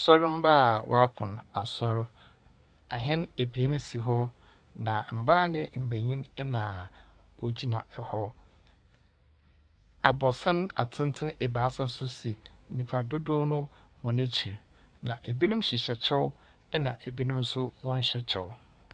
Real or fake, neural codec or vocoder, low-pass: fake; codec, 24 kHz, 1 kbps, SNAC; 9.9 kHz